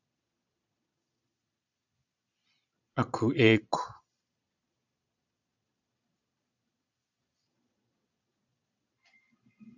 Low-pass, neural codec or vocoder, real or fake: 7.2 kHz; vocoder, 22.05 kHz, 80 mel bands, Vocos; fake